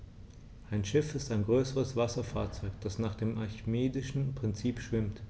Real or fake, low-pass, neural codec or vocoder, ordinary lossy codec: real; none; none; none